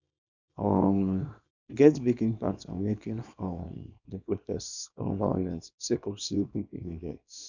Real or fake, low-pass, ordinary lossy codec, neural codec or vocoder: fake; 7.2 kHz; none; codec, 24 kHz, 0.9 kbps, WavTokenizer, small release